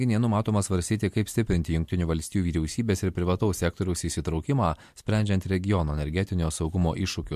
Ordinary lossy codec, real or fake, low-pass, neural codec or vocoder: MP3, 64 kbps; real; 14.4 kHz; none